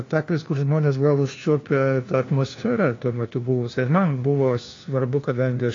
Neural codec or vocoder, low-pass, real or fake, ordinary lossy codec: codec, 16 kHz, 1 kbps, FunCodec, trained on LibriTTS, 50 frames a second; 7.2 kHz; fake; AAC, 32 kbps